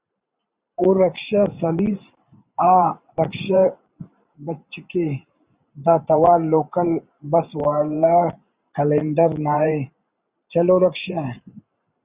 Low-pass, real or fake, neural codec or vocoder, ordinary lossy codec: 3.6 kHz; fake; vocoder, 44.1 kHz, 128 mel bands every 512 samples, BigVGAN v2; Opus, 64 kbps